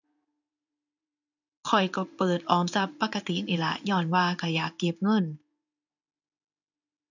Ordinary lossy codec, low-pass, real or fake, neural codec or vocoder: none; 7.2 kHz; fake; codec, 16 kHz in and 24 kHz out, 1 kbps, XY-Tokenizer